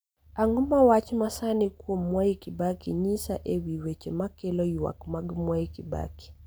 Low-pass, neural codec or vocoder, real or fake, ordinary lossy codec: none; none; real; none